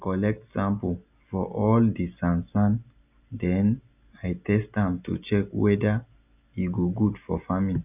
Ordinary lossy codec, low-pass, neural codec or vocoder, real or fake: none; 3.6 kHz; none; real